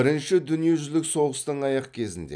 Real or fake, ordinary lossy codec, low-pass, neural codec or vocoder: real; none; 9.9 kHz; none